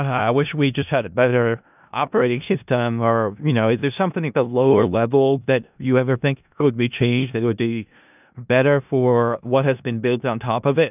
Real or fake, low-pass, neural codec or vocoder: fake; 3.6 kHz; codec, 16 kHz in and 24 kHz out, 0.4 kbps, LongCat-Audio-Codec, four codebook decoder